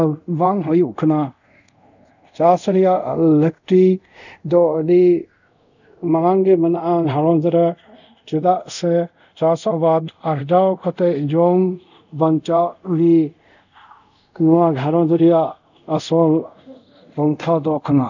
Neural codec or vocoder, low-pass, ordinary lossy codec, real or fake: codec, 24 kHz, 0.5 kbps, DualCodec; 7.2 kHz; none; fake